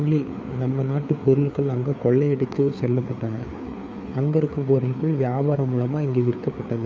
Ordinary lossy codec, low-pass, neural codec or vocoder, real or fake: none; none; codec, 16 kHz, 4 kbps, FreqCodec, larger model; fake